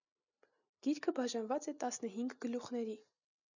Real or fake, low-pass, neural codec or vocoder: real; 7.2 kHz; none